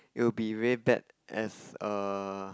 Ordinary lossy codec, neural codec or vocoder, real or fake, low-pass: none; none; real; none